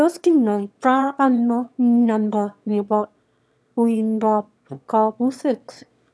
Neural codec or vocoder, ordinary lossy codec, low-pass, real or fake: autoencoder, 22.05 kHz, a latent of 192 numbers a frame, VITS, trained on one speaker; none; none; fake